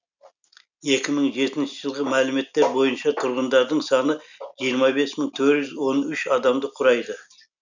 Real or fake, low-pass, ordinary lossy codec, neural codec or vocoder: real; 7.2 kHz; none; none